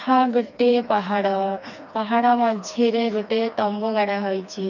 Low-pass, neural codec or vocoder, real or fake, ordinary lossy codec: 7.2 kHz; codec, 16 kHz, 2 kbps, FreqCodec, smaller model; fake; none